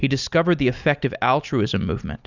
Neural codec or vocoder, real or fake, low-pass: none; real; 7.2 kHz